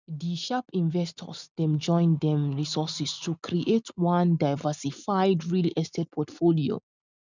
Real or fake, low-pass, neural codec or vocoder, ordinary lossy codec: real; 7.2 kHz; none; none